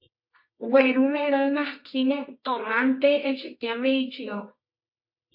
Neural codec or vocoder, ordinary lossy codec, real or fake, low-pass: codec, 24 kHz, 0.9 kbps, WavTokenizer, medium music audio release; MP3, 32 kbps; fake; 5.4 kHz